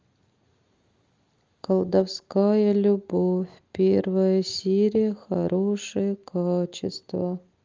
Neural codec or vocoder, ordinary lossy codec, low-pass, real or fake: none; Opus, 32 kbps; 7.2 kHz; real